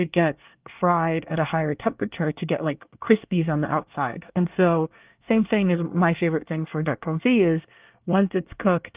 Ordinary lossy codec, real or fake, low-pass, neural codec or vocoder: Opus, 32 kbps; fake; 3.6 kHz; codec, 24 kHz, 1 kbps, SNAC